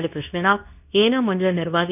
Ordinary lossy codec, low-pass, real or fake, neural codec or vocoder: none; 3.6 kHz; fake; codec, 24 kHz, 0.9 kbps, WavTokenizer, medium speech release version 2